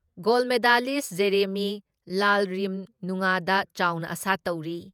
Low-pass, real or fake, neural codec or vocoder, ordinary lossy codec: 19.8 kHz; fake; vocoder, 48 kHz, 128 mel bands, Vocos; none